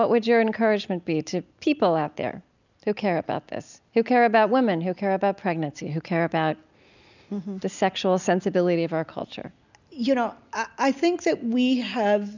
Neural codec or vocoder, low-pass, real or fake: none; 7.2 kHz; real